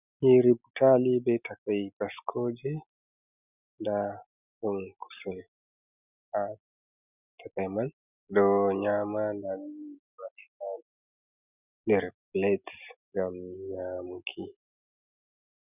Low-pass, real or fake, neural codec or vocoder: 3.6 kHz; real; none